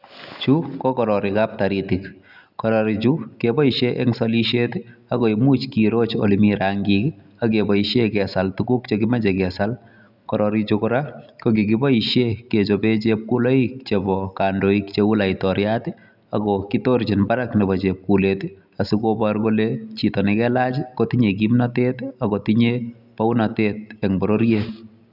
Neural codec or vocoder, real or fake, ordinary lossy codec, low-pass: none; real; none; 5.4 kHz